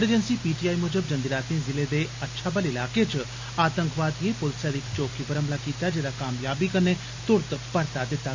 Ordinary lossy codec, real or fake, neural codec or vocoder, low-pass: MP3, 48 kbps; real; none; 7.2 kHz